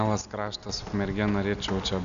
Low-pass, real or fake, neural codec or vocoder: 7.2 kHz; real; none